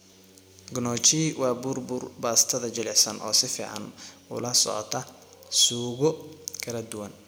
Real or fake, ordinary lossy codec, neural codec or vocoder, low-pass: real; none; none; none